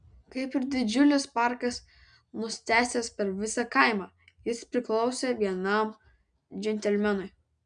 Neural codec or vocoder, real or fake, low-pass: none; real; 9.9 kHz